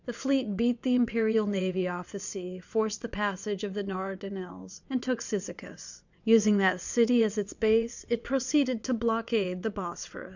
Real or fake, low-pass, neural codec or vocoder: fake; 7.2 kHz; vocoder, 22.05 kHz, 80 mel bands, WaveNeXt